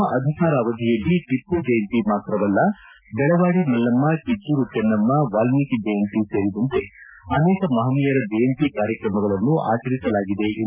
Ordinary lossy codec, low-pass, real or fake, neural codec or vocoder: none; 3.6 kHz; real; none